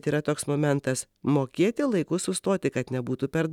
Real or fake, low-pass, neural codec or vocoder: real; 19.8 kHz; none